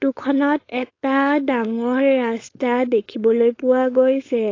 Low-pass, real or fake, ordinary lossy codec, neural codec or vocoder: 7.2 kHz; fake; AAC, 32 kbps; codec, 16 kHz, 4.8 kbps, FACodec